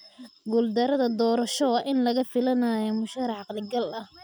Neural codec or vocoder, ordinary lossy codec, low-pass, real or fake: none; none; none; real